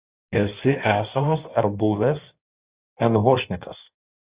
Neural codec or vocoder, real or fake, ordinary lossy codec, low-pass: codec, 16 kHz in and 24 kHz out, 1.1 kbps, FireRedTTS-2 codec; fake; Opus, 64 kbps; 3.6 kHz